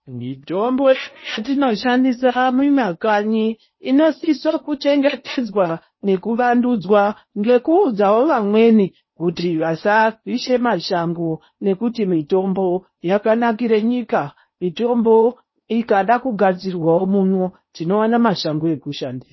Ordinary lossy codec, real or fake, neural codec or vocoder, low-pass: MP3, 24 kbps; fake; codec, 16 kHz in and 24 kHz out, 0.8 kbps, FocalCodec, streaming, 65536 codes; 7.2 kHz